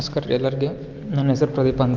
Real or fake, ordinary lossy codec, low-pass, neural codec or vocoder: real; Opus, 32 kbps; 7.2 kHz; none